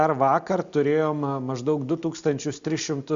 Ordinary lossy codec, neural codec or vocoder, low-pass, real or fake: Opus, 64 kbps; none; 7.2 kHz; real